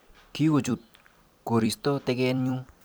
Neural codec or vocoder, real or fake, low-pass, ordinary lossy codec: vocoder, 44.1 kHz, 128 mel bands every 256 samples, BigVGAN v2; fake; none; none